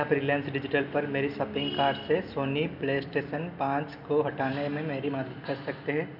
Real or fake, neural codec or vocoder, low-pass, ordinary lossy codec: real; none; 5.4 kHz; none